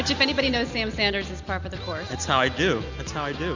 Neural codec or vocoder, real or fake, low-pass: none; real; 7.2 kHz